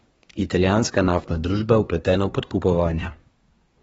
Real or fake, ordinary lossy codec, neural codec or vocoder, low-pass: fake; AAC, 24 kbps; codec, 24 kHz, 1 kbps, SNAC; 10.8 kHz